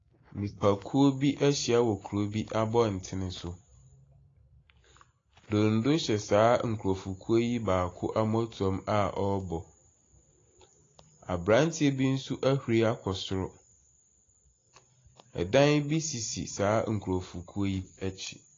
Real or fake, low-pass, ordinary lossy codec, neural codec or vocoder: real; 7.2 kHz; AAC, 32 kbps; none